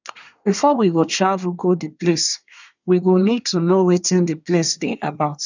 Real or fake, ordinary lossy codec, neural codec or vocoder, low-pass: fake; none; codec, 24 kHz, 1 kbps, SNAC; 7.2 kHz